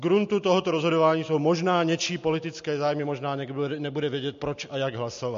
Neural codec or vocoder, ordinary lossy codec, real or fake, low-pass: none; MP3, 48 kbps; real; 7.2 kHz